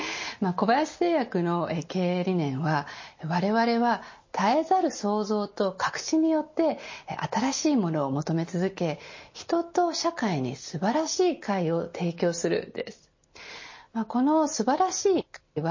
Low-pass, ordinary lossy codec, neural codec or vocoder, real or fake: 7.2 kHz; MP3, 32 kbps; none; real